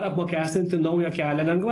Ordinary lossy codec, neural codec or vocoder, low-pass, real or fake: AAC, 32 kbps; none; 10.8 kHz; real